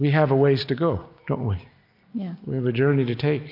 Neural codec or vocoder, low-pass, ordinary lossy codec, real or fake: none; 5.4 kHz; MP3, 48 kbps; real